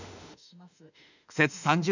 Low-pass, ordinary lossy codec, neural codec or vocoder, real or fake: 7.2 kHz; none; autoencoder, 48 kHz, 32 numbers a frame, DAC-VAE, trained on Japanese speech; fake